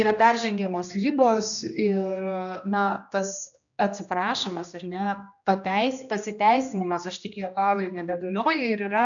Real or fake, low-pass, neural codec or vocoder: fake; 7.2 kHz; codec, 16 kHz, 1 kbps, X-Codec, HuBERT features, trained on general audio